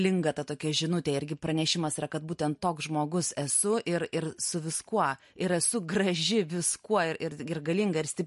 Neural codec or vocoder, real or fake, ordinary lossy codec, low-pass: none; real; MP3, 48 kbps; 14.4 kHz